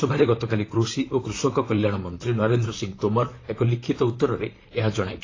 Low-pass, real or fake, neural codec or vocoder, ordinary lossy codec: 7.2 kHz; fake; vocoder, 44.1 kHz, 128 mel bands, Pupu-Vocoder; AAC, 32 kbps